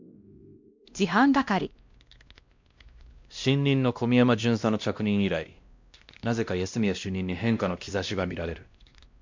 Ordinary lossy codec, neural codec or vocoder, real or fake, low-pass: MP3, 64 kbps; codec, 16 kHz, 1 kbps, X-Codec, WavLM features, trained on Multilingual LibriSpeech; fake; 7.2 kHz